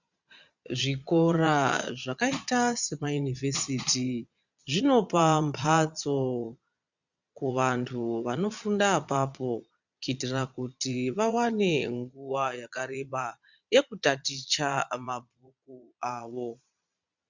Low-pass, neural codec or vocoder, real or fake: 7.2 kHz; vocoder, 22.05 kHz, 80 mel bands, Vocos; fake